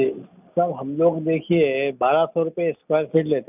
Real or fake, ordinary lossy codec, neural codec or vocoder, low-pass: real; none; none; 3.6 kHz